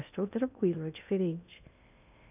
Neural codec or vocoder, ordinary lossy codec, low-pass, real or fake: codec, 16 kHz in and 24 kHz out, 0.6 kbps, FocalCodec, streaming, 2048 codes; none; 3.6 kHz; fake